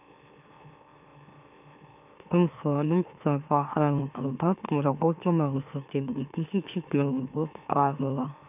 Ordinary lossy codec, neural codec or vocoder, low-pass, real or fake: none; autoencoder, 44.1 kHz, a latent of 192 numbers a frame, MeloTTS; 3.6 kHz; fake